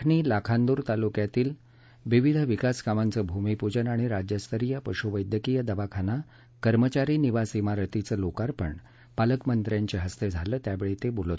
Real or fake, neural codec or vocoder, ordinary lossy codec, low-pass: real; none; none; none